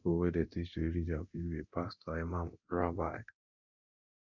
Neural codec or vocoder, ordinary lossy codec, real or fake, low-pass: codec, 24 kHz, 0.9 kbps, DualCodec; none; fake; 7.2 kHz